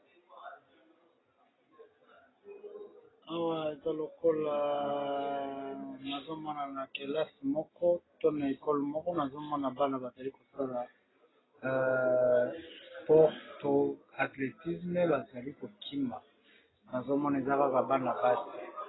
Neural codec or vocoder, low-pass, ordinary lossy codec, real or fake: none; 7.2 kHz; AAC, 16 kbps; real